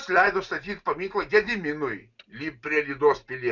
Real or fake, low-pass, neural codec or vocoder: real; 7.2 kHz; none